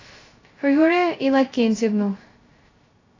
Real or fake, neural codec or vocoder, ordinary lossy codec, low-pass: fake; codec, 16 kHz, 0.2 kbps, FocalCodec; AAC, 32 kbps; 7.2 kHz